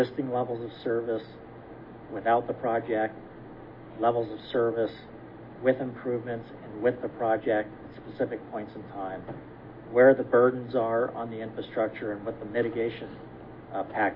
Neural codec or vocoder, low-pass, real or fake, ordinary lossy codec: none; 5.4 kHz; real; MP3, 24 kbps